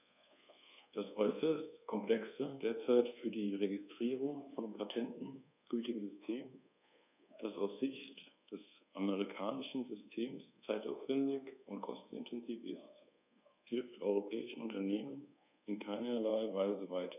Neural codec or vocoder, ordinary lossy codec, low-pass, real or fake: codec, 24 kHz, 1.2 kbps, DualCodec; none; 3.6 kHz; fake